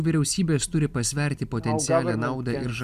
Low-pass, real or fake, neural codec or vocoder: 14.4 kHz; real; none